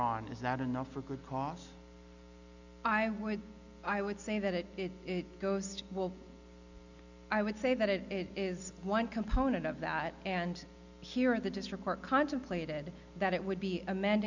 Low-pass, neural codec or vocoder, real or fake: 7.2 kHz; none; real